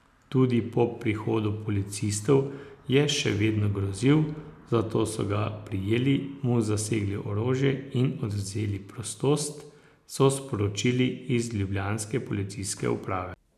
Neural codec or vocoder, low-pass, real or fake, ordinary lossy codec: none; 14.4 kHz; real; none